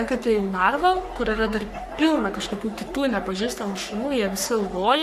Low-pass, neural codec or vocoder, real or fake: 14.4 kHz; codec, 44.1 kHz, 3.4 kbps, Pupu-Codec; fake